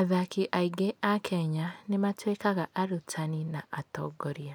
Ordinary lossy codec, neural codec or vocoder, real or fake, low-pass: none; none; real; none